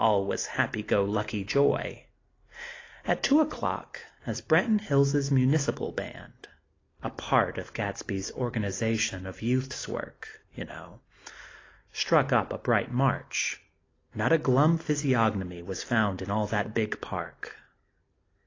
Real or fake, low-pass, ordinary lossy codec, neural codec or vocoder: real; 7.2 kHz; AAC, 32 kbps; none